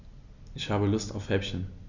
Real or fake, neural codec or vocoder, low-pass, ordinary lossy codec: real; none; 7.2 kHz; none